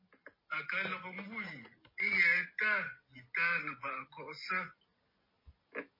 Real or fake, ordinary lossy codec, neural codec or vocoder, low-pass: real; MP3, 24 kbps; none; 5.4 kHz